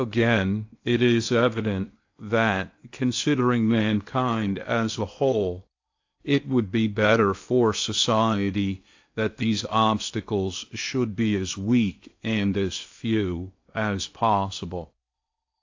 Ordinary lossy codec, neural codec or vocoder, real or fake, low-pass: AAC, 48 kbps; codec, 16 kHz in and 24 kHz out, 0.8 kbps, FocalCodec, streaming, 65536 codes; fake; 7.2 kHz